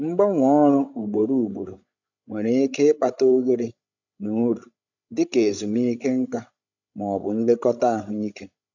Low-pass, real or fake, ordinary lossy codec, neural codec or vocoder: 7.2 kHz; fake; none; codec, 16 kHz, 16 kbps, FreqCodec, larger model